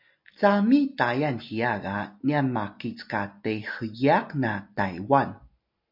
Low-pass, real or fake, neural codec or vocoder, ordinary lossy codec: 5.4 kHz; real; none; MP3, 48 kbps